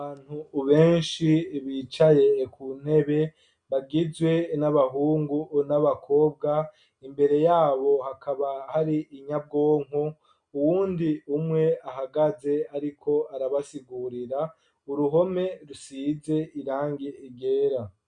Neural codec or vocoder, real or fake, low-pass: none; real; 9.9 kHz